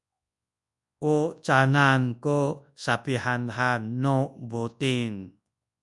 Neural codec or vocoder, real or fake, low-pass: codec, 24 kHz, 0.9 kbps, WavTokenizer, large speech release; fake; 10.8 kHz